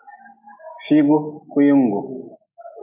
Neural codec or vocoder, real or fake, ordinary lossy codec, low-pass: none; real; MP3, 24 kbps; 3.6 kHz